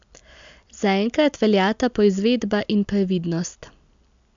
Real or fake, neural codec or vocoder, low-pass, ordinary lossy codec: real; none; 7.2 kHz; MP3, 64 kbps